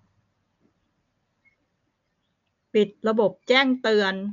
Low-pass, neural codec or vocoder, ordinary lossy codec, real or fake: 7.2 kHz; none; MP3, 64 kbps; real